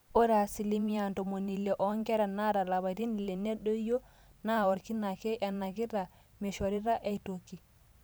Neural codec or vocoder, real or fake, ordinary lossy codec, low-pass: vocoder, 44.1 kHz, 128 mel bands every 512 samples, BigVGAN v2; fake; none; none